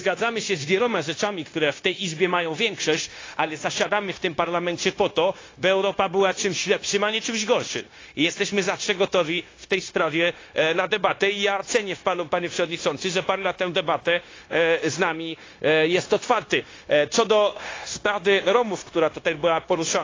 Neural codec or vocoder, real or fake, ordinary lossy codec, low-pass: codec, 16 kHz, 0.9 kbps, LongCat-Audio-Codec; fake; AAC, 32 kbps; 7.2 kHz